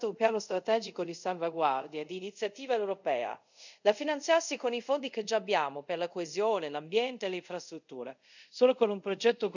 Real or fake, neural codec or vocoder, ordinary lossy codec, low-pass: fake; codec, 24 kHz, 0.5 kbps, DualCodec; none; 7.2 kHz